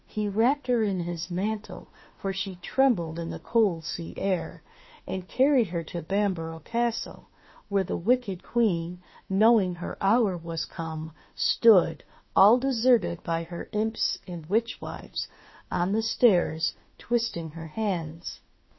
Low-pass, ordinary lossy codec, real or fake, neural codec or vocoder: 7.2 kHz; MP3, 24 kbps; fake; autoencoder, 48 kHz, 32 numbers a frame, DAC-VAE, trained on Japanese speech